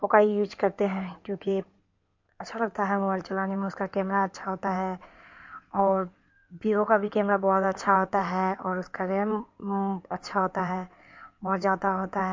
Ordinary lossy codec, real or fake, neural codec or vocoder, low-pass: MP3, 48 kbps; fake; codec, 16 kHz in and 24 kHz out, 2.2 kbps, FireRedTTS-2 codec; 7.2 kHz